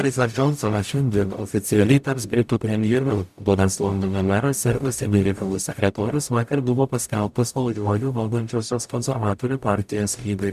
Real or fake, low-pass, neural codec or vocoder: fake; 14.4 kHz; codec, 44.1 kHz, 0.9 kbps, DAC